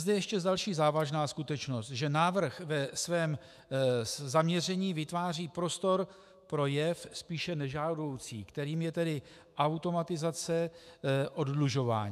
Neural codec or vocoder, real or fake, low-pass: autoencoder, 48 kHz, 128 numbers a frame, DAC-VAE, trained on Japanese speech; fake; 14.4 kHz